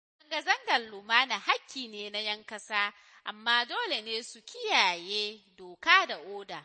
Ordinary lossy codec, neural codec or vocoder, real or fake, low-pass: MP3, 32 kbps; none; real; 9.9 kHz